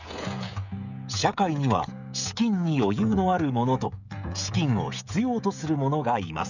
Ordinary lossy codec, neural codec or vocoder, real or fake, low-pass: none; codec, 16 kHz, 16 kbps, FreqCodec, smaller model; fake; 7.2 kHz